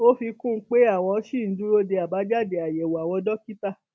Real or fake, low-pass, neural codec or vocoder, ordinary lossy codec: real; none; none; none